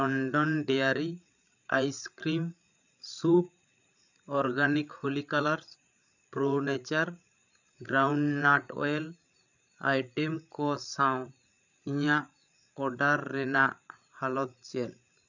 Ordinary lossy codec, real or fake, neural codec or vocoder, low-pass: none; fake; codec, 16 kHz, 8 kbps, FreqCodec, larger model; 7.2 kHz